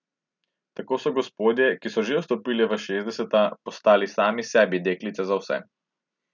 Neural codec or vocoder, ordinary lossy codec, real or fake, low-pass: none; none; real; 7.2 kHz